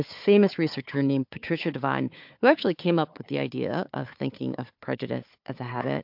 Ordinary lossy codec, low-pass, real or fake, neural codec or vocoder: MP3, 48 kbps; 5.4 kHz; fake; codec, 16 kHz, 4 kbps, FunCodec, trained on Chinese and English, 50 frames a second